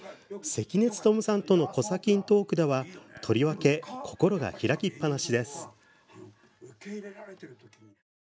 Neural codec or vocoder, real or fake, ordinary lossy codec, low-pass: none; real; none; none